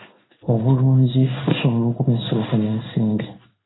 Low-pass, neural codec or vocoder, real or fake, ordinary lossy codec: 7.2 kHz; codec, 16 kHz in and 24 kHz out, 1 kbps, XY-Tokenizer; fake; AAC, 16 kbps